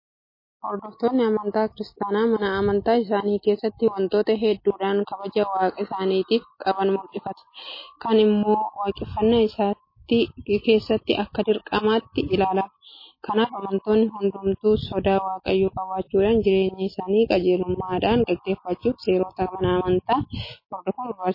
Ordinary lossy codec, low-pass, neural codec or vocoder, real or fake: MP3, 24 kbps; 5.4 kHz; none; real